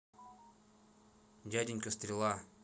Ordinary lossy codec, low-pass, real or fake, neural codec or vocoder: none; none; real; none